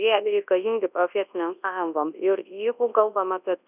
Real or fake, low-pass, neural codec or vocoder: fake; 3.6 kHz; codec, 24 kHz, 0.9 kbps, WavTokenizer, large speech release